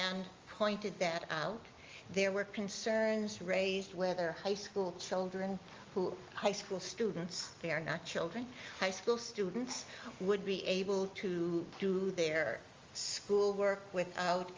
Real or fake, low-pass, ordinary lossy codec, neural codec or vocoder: real; 7.2 kHz; Opus, 32 kbps; none